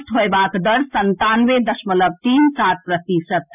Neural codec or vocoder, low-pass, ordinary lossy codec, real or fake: none; 3.6 kHz; none; real